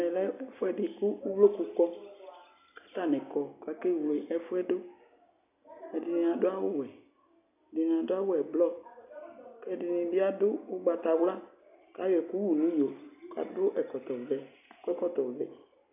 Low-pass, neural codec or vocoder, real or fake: 3.6 kHz; none; real